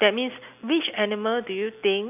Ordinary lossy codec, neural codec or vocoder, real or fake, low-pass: none; none; real; 3.6 kHz